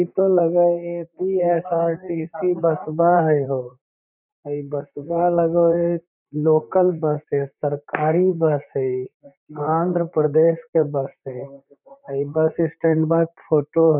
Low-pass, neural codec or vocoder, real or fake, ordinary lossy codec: 3.6 kHz; vocoder, 44.1 kHz, 128 mel bands, Pupu-Vocoder; fake; none